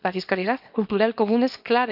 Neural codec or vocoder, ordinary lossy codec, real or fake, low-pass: codec, 24 kHz, 0.9 kbps, WavTokenizer, small release; none; fake; 5.4 kHz